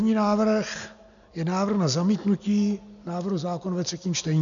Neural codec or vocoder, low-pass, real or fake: none; 7.2 kHz; real